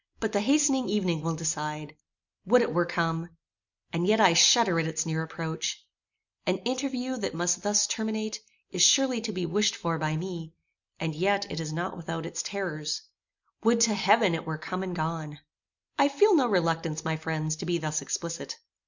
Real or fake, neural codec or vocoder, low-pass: real; none; 7.2 kHz